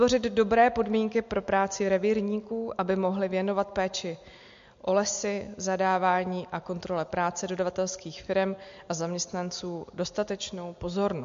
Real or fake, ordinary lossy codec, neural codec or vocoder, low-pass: real; MP3, 48 kbps; none; 7.2 kHz